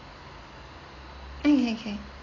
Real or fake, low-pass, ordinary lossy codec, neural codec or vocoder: real; 7.2 kHz; AAC, 32 kbps; none